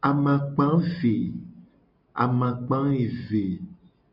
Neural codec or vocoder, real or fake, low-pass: none; real; 5.4 kHz